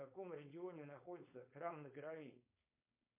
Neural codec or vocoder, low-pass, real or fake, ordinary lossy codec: codec, 16 kHz, 4.8 kbps, FACodec; 3.6 kHz; fake; MP3, 24 kbps